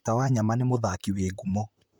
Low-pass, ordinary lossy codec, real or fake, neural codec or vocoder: none; none; fake; vocoder, 44.1 kHz, 128 mel bands, Pupu-Vocoder